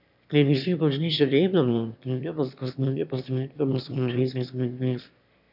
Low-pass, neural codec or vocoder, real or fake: 5.4 kHz; autoencoder, 22.05 kHz, a latent of 192 numbers a frame, VITS, trained on one speaker; fake